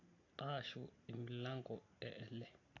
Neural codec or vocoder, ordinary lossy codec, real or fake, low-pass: none; AAC, 32 kbps; real; 7.2 kHz